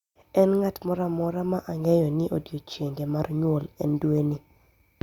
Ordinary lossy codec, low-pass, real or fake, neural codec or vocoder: Opus, 64 kbps; 19.8 kHz; real; none